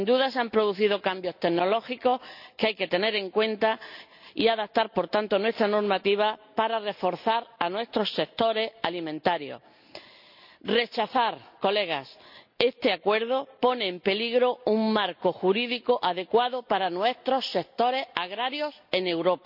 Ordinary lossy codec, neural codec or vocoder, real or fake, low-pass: none; none; real; 5.4 kHz